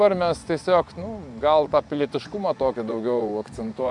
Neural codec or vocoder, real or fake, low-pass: vocoder, 24 kHz, 100 mel bands, Vocos; fake; 10.8 kHz